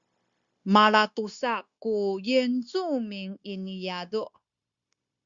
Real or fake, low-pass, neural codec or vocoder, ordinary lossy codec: fake; 7.2 kHz; codec, 16 kHz, 0.9 kbps, LongCat-Audio-Codec; Opus, 64 kbps